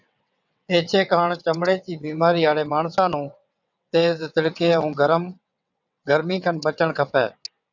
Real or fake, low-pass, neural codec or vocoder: fake; 7.2 kHz; vocoder, 22.05 kHz, 80 mel bands, WaveNeXt